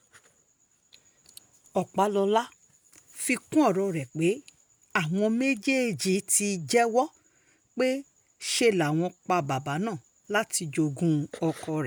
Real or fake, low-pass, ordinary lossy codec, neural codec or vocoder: real; none; none; none